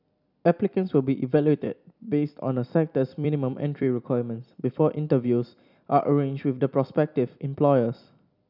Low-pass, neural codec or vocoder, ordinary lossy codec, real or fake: 5.4 kHz; vocoder, 44.1 kHz, 128 mel bands every 256 samples, BigVGAN v2; none; fake